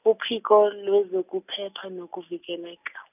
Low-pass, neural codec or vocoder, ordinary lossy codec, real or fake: 3.6 kHz; none; none; real